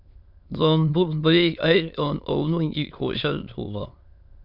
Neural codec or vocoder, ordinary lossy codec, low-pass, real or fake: autoencoder, 22.05 kHz, a latent of 192 numbers a frame, VITS, trained on many speakers; Opus, 64 kbps; 5.4 kHz; fake